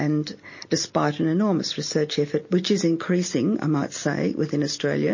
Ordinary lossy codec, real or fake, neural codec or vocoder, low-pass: MP3, 32 kbps; real; none; 7.2 kHz